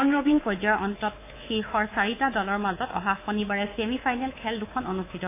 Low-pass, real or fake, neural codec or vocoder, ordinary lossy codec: 3.6 kHz; fake; codec, 24 kHz, 3.1 kbps, DualCodec; AAC, 24 kbps